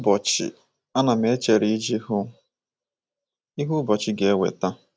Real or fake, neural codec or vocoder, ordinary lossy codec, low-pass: real; none; none; none